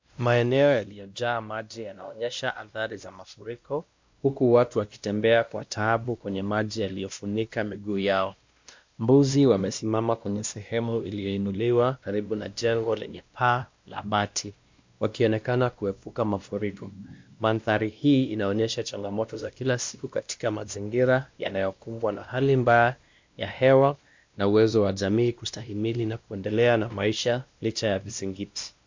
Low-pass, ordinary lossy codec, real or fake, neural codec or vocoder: 7.2 kHz; MP3, 64 kbps; fake; codec, 16 kHz, 1 kbps, X-Codec, WavLM features, trained on Multilingual LibriSpeech